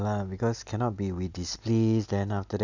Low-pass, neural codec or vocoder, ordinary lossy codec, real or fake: 7.2 kHz; none; none; real